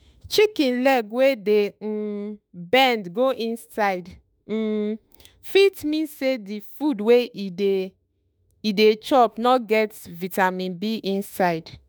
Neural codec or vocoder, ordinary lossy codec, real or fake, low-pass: autoencoder, 48 kHz, 32 numbers a frame, DAC-VAE, trained on Japanese speech; none; fake; none